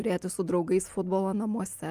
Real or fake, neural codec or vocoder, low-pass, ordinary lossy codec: real; none; 14.4 kHz; Opus, 32 kbps